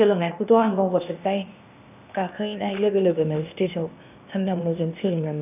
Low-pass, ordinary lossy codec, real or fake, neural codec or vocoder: 3.6 kHz; none; fake; codec, 16 kHz, 0.8 kbps, ZipCodec